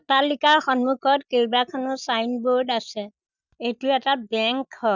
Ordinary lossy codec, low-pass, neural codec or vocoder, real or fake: none; 7.2 kHz; none; real